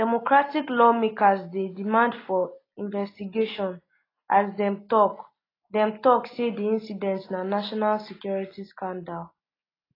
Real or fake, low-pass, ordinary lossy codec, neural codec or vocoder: real; 5.4 kHz; AAC, 24 kbps; none